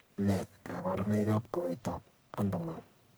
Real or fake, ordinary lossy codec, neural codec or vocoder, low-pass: fake; none; codec, 44.1 kHz, 1.7 kbps, Pupu-Codec; none